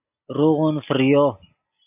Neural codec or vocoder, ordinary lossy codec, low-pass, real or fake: none; AAC, 32 kbps; 3.6 kHz; real